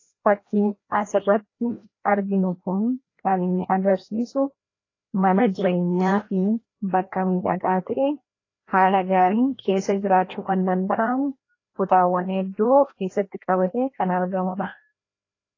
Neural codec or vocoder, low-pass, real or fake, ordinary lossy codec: codec, 16 kHz, 1 kbps, FreqCodec, larger model; 7.2 kHz; fake; AAC, 32 kbps